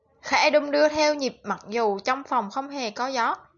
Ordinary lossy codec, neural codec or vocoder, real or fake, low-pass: MP3, 64 kbps; none; real; 7.2 kHz